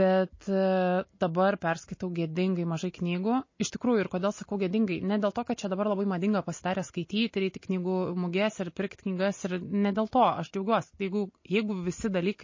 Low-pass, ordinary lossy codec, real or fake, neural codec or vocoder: 7.2 kHz; MP3, 32 kbps; real; none